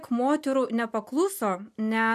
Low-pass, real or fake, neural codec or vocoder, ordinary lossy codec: 14.4 kHz; real; none; MP3, 96 kbps